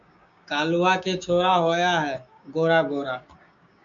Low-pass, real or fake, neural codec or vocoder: 7.2 kHz; fake; codec, 16 kHz, 6 kbps, DAC